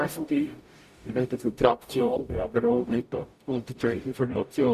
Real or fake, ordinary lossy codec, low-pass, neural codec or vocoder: fake; Opus, 64 kbps; 14.4 kHz; codec, 44.1 kHz, 0.9 kbps, DAC